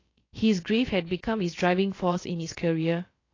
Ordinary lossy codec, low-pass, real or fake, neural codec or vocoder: AAC, 32 kbps; 7.2 kHz; fake; codec, 16 kHz, about 1 kbps, DyCAST, with the encoder's durations